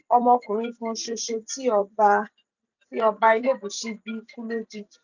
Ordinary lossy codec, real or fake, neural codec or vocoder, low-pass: none; fake; vocoder, 44.1 kHz, 128 mel bands every 256 samples, BigVGAN v2; 7.2 kHz